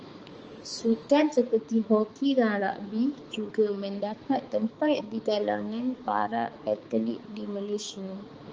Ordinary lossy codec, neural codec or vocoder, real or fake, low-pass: Opus, 24 kbps; codec, 16 kHz, 4 kbps, X-Codec, HuBERT features, trained on balanced general audio; fake; 7.2 kHz